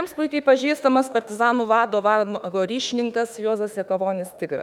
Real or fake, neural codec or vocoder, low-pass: fake; autoencoder, 48 kHz, 32 numbers a frame, DAC-VAE, trained on Japanese speech; 19.8 kHz